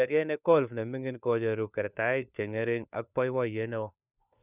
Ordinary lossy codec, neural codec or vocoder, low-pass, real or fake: none; codec, 16 kHz, 4 kbps, FunCodec, trained on LibriTTS, 50 frames a second; 3.6 kHz; fake